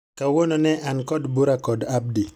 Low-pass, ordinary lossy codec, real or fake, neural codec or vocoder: 19.8 kHz; none; real; none